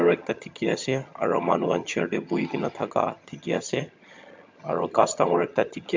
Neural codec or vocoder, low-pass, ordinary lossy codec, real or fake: vocoder, 22.05 kHz, 80 mel bands, HiFi-GAN; 7.2 kHz; MP3, 64 kbps; fake